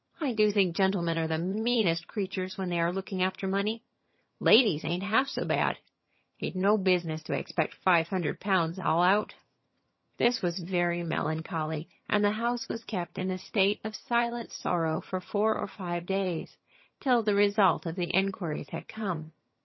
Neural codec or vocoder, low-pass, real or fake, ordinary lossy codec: vocoder, 22.05 kHz, 80 mel bands, HiFi-GAN; 7.2 kHz; fake; MP3, 24 kbps